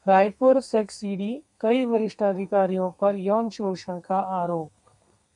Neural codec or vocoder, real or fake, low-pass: codec, 32 kHz, 1.9 kbps, SNAC; fake; 10.8 kHz